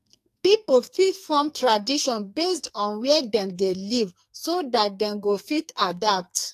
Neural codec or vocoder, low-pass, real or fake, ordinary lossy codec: codec, 44.1 kHz, 2.6 kbps, SNAC; 14.4 kHz; fake; AAC, 64 kbps